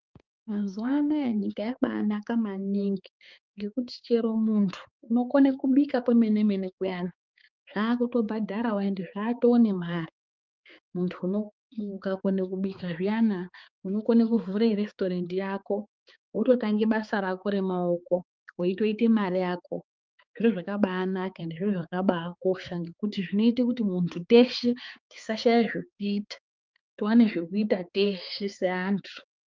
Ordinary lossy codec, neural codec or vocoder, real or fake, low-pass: Opus, 24 kbps; codec, 16 kHz, 4 kbps, X-Codec, HuBERT features, trained on balanced general audio; fake; 7.2 kHz